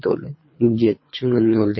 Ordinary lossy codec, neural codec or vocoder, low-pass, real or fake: MP3, 24 kbps; codec, 24 kHz, 3 kbps, HILCodec; 7.2 kHz; fake